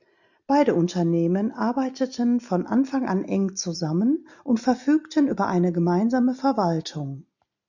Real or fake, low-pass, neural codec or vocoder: real; 7.2 kHz; none